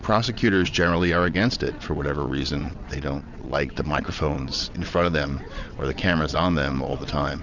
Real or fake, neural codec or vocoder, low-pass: fake; vocoder, 22.05 kHz, 80 mel bands, WaveNeXt; 7.2 kHz